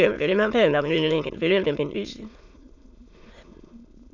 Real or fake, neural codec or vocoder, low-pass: fake; autoencoder, 22.05 kHz, a latent of 192 numbers a frame, VITS, trained on many speakers; 7.2 kHz